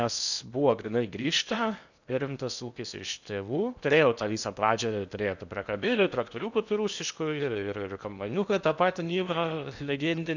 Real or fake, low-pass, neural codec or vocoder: fake; 7.2 kHz; codec, 16 kHz in and 24 kHz out, 0.8 kbps, FocalCodec, streaming, 65536 codes